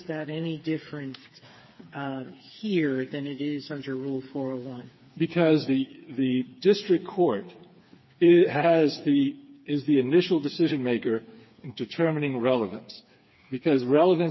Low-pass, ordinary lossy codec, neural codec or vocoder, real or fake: 7.2 kHz; MP3, 24 kbps; codec, 16 kHz, 4 kbps, FreqCodec, smaller model; fake